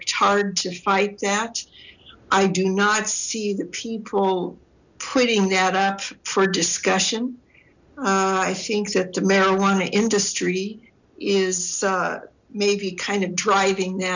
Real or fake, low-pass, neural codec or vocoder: real; 7.2 kHz; none